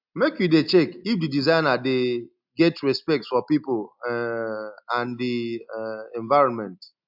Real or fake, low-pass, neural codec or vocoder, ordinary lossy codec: real; 5.4 kHz; none; AAC, 48 kbps